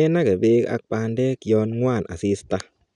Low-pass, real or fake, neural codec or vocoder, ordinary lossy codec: 10.8 kHz; real; none; none